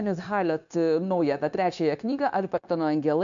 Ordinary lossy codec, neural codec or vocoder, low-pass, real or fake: MP3, 64 kbps; codec, 16 kHz, 0.9 kbps, LongCat-Audio-Codec; 7.2 kHz; fake